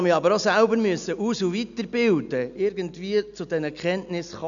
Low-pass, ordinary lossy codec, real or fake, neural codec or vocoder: 7.2 kHz; none; real; none